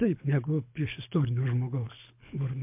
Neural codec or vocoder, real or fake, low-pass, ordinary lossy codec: codec, 24 kHz, 6 kbps, HILCodec; fake; 3.6 kHz; MP3, 32 kbps